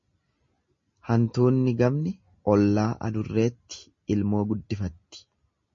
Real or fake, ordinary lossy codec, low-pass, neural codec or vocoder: real; MP3, 32 kbps; 7.2 kHz; none